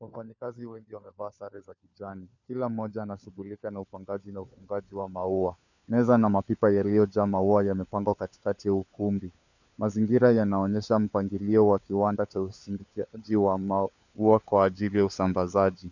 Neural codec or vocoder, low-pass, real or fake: codec, 16 kHz, 4 kbps, FunCodec, trained on LibriTTS, 50 frames a second; 7.2 kHz; fake